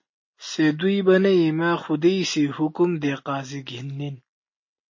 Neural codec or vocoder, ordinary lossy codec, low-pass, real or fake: none; MP3, 32 kbps; 7.2 kHz; real